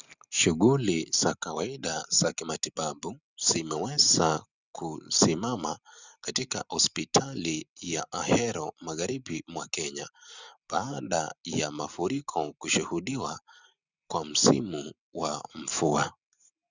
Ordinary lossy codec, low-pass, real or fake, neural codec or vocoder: Opus, 64 kbps; 7.2 kHz; real; none